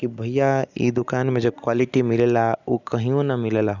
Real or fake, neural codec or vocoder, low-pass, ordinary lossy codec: real; none; 7.2 kHz; none